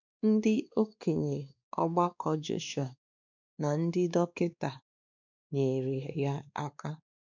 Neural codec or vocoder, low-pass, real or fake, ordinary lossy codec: codec, 16 kHz, 2 kbps, X-Codec, WavLM features, trained on Multilingual LibriSpeech; 7.2 kHz; fake; none